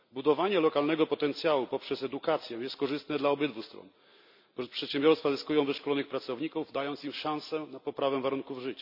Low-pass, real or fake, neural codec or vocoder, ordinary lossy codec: 5.4 kHz; real; none; none